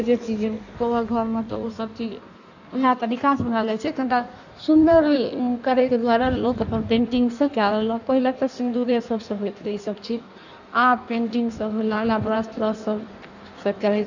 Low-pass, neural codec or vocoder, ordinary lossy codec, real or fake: 7.2 kHz; codec, 16 kHz in and 24 kHz out, 1.1 kbps, FireRedTTS-2 codec; none; fake